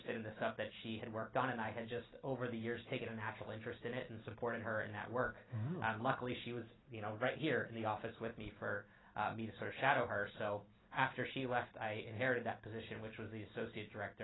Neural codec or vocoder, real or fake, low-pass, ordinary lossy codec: none; real; 7.2 kHz; AAC, 16 kbps